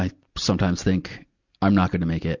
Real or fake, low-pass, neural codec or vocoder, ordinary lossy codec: real; 7.2 kHz; none; Opus, 64 kbps